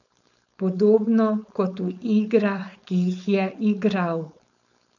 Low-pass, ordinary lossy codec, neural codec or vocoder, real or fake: 7.2 kHz; none; codec, 16 kHz, 4.8 kbps, FACodec; fake